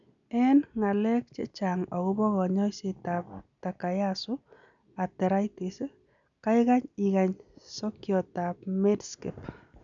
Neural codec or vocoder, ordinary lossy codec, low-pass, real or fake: none; none; 7.2 kHz; real